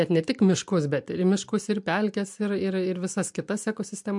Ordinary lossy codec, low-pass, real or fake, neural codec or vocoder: MP3, 64 kbps; 10.8 kHz; real; none